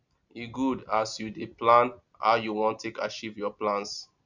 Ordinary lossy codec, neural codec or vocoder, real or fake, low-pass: none; none; real; 7.2 kHz